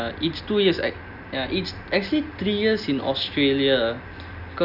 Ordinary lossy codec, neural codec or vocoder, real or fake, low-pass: none; none; real; 5.4 kHz